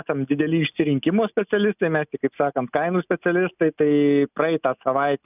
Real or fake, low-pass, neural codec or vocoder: real; 3.6 kHz; none